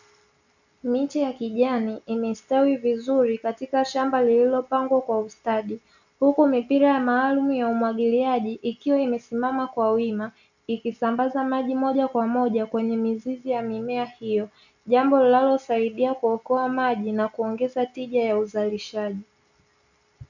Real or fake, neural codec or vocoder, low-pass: real; none; 7.2 kHz